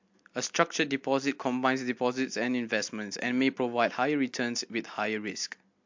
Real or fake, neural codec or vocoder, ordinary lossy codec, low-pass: real; none; MP3, 48 kbps; 7.2 kHz